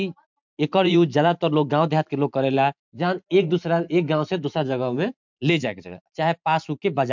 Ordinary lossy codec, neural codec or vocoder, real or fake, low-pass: MP3, 64 kbps; vocoder, 44.1 kHz, 128 mel bands every 512 samples, BigVGAN v2; fake; 7.2 kHz